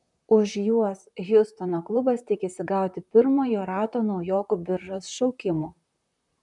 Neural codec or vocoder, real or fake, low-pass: vocoder, 44.1 kHz, 128 mel bands, Pupu-Vocoder; fake; 10.8 kHz